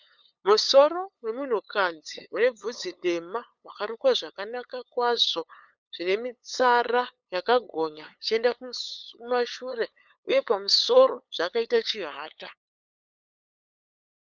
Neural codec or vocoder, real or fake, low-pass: codec, 16 kHz, 8 kbps, FunCodec, trained on LibriTTS, 25 frames a second; fake; 7.2 kHz